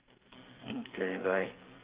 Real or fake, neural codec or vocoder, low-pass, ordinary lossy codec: fake; codec, 44.1 kHz, 2.6 kbps, SNAC; 3.6 kHz; Opus, 24 kbps